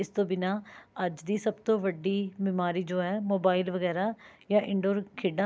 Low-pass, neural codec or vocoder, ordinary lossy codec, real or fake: none; none; none; real